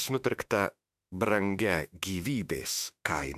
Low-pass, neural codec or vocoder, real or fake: 14.4 kHz; autoencoder, 48 kHz, 32 numbers a frame, DAC-VAE, trained on Japanese speech; fake